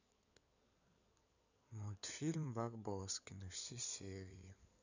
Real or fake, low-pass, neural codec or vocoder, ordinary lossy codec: fake; 7.2 kHz; codec, 16 kHz in and 24 kHz out, 2.2 kbps, FireRedTTS-2 codec; none